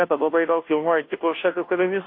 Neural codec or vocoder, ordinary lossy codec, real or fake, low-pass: codec, 16 kHz, 0.5 kbps, FunCodec, trained on Chinese and English, 25 frames a second; MP3, 32 kbps; fake; 5.4 kHz